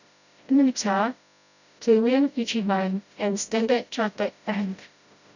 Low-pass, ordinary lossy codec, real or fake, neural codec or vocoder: 7.2 kHz; none; fake; codec, 16 kHz, 0.5 kbps, FreqCodec, smaller model